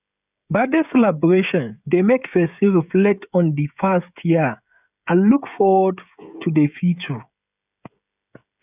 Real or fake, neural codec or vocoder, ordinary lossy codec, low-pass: fake; codec, 16 kHz, 16 kbps, FreqCodec, smaller model; none; 3.6 kHz